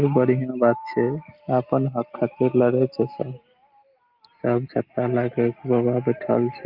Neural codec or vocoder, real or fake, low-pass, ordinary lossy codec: none; real; 5.4 kHz; Opus, 32 kbps